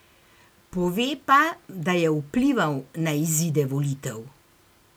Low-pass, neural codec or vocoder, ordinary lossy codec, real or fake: none; none; none; real